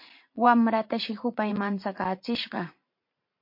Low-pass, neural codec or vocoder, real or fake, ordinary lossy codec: 5.4 kHz; vocoder, 44.1 kHz, 128 mel bands every 512 samples, BigVGAN v2; fake; MP3, 32 kbps